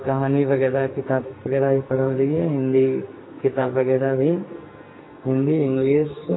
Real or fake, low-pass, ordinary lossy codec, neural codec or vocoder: fake; 7.2 kHz; AAC, 16 kbps; codec, 44.1 kHz, 2.6 kbps, SNAC